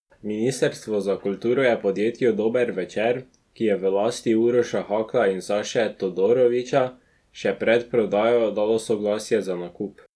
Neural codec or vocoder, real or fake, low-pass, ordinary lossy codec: none; real; none; none